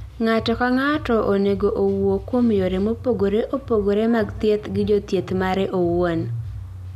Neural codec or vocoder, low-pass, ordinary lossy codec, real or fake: none; 14.4 kHz; none; real